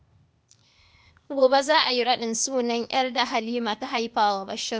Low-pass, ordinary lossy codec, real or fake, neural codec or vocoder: none; none; fake; codec, 16 kHz, 0.8 kbps, ZipCodec